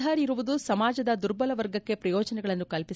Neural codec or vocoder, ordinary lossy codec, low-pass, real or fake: none; none; none; real